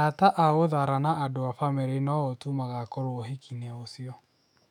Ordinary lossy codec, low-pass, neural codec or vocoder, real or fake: none; 19.8 kHz; autoencoder, 48 kHz, 128 numbers a frame, DAC-VAE, trained on Japanese speech; fake